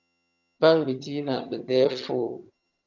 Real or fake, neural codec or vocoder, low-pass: fake; vocoder, 22.05 kHz, 80 mel bands, HiFi-GAN; 7.2 kHz